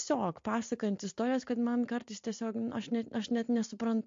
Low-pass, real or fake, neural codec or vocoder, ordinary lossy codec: 7.2 kHz; real; none; MP3, 48 kbps